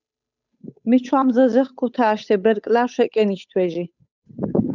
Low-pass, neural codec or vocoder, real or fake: 7.2 kHz; codec, 16 kHz, 8 kbps, FunCodec, trained on Chinese and English, 25 frames a second; fake